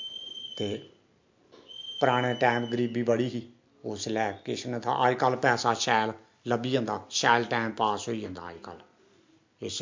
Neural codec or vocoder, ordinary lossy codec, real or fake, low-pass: none; MP3, 48 kbps; real; 7.2 kHz